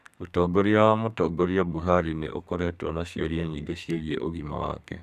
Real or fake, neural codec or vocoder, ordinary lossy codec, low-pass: fake; codec, 32 kHz, 1.9 kbps, SNAC; none; 14.4 kHz